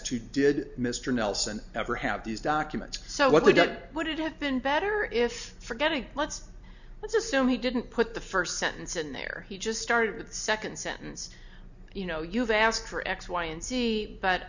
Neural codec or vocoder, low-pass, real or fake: none; 7.2 kHz; real